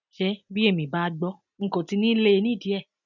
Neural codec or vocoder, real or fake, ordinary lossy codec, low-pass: none; real; none; 7.2 kHz